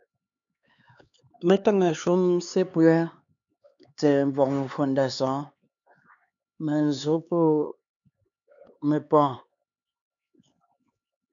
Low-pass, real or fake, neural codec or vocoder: 7.2 kHz; fake; codec, 16 kHz, 4 kbps, X-Codec, HuBERT features, trained on LibriSpeech